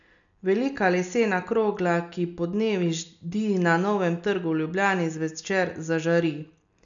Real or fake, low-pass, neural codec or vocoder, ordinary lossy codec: real; 7.2 kHz; none; none